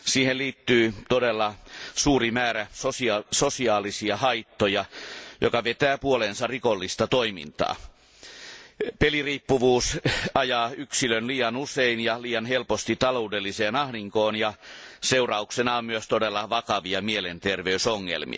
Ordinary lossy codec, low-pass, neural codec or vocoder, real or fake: none; none; none; real